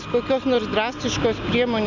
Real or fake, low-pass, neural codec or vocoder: real; 7.2 kHz; none